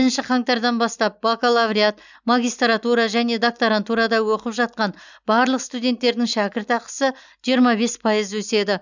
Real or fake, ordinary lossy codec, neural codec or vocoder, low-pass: real; none; none; 7.2 kHz